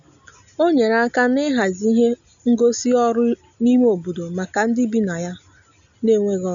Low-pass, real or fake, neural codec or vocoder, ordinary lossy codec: 7.2 kHz; real; none; none